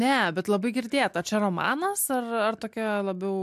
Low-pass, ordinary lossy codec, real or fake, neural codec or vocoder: 14.4 kHz; MP3, 96 kbps; real; none